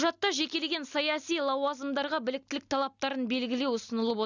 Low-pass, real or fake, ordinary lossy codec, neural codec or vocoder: 7.2 kHz; real; none; none